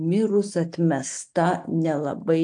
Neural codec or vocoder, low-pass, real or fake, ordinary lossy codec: none; 9.9 kHz; real; AAC, 64 kbps